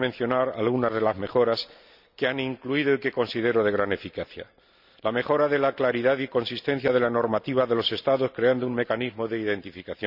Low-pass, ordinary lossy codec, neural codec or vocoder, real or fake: 5.4 kHz; none; none; real